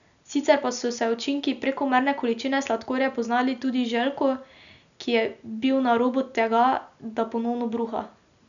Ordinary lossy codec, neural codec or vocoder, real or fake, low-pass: none; none; real; 7.2 kHz